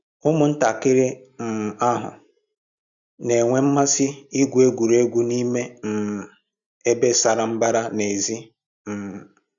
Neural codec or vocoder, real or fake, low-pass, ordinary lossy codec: none; real; 7.2 kHz; none